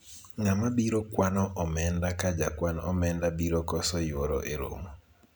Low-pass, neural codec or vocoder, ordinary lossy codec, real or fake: none; none; none; real